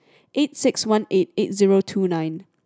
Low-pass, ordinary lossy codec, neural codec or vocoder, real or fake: none; none; none; real